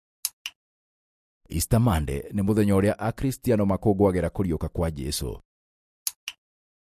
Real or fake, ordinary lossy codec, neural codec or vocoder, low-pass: real; MP3, 64 kbps; none; 14.4 kHz